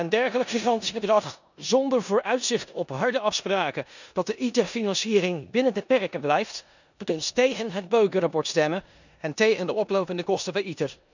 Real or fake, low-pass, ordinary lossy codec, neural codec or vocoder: fake; 7.2 kHz; none; codec, 16 kHz in and 24 kHz out, 0.9 kbps, LongCat-Audio-Codec, four codebook decoder